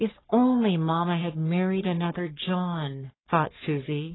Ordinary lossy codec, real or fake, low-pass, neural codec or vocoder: AAC, 16 kbps; fake; 7.2 kHz; codec, 44.1 kHz, 3.4 kbps, Pupu-Codec